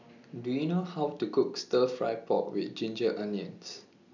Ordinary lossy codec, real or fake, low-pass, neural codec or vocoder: none; real; 7.2 kHz; none